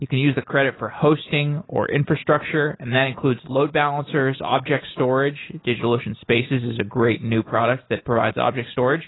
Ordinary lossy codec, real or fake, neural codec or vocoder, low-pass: AAC, 16 kbps; real; none; 7.2 kHz